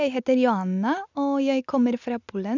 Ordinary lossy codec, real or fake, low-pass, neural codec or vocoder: none; real; 7.2 kHz; none